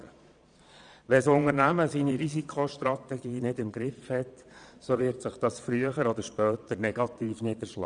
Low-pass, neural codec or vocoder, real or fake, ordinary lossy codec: 9.9 kHz; vocoder, 22.05 kHz, 80 mel bands, Vocos; fake; none